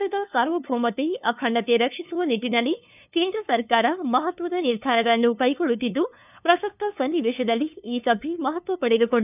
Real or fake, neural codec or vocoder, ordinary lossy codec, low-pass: fake; codec, 16 kHz, 2 kbps, FunCodec, trained on LibriTTS, 25 frames a second; none; 3.6 kHz